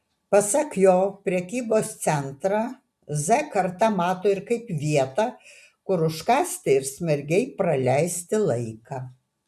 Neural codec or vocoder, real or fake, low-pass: none; real; 14.4 kHz